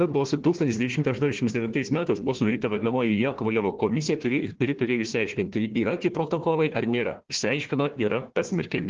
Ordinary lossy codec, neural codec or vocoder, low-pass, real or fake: Opus, 16 kbps; codec, 16 kHz, 1 kbps, FunCodec, trained on Chinese and English, 50 frames a second; 7.2 kHz; fake